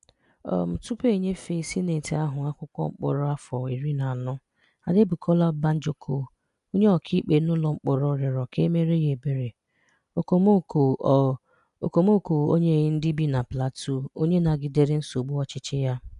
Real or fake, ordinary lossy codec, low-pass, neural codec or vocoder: real; none; 10.8 kHz; none